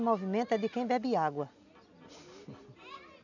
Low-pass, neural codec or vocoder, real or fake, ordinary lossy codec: 7.2 kHz; none; real; none